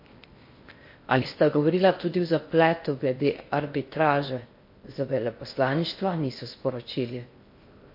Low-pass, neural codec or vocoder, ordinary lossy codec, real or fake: 5.4 kHz; codec, 16 kHz in and 24 kHz out, 0.6 kbps, FocalCodec, streaming, 4096 codes; MP3, 32 kbps; fake